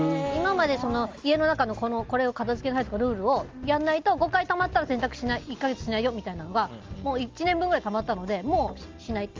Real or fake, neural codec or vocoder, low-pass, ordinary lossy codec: real; none; 7.2 kHz; Opus, 32 kbps